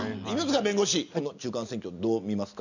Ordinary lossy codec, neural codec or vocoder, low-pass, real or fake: none; none; 7.2 kHz; real